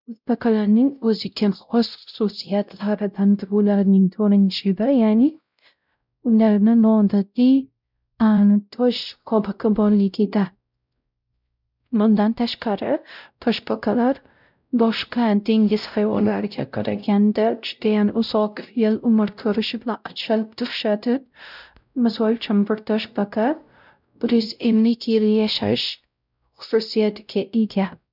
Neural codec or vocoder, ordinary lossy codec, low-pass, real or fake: codec, 16 kHz, 0.5 kbps, X-Codec, WavLM features, trained on Multilingual LibriSpeech; none; 5.4 kHz; fake